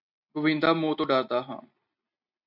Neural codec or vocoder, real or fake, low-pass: none; real; 5.4 kHz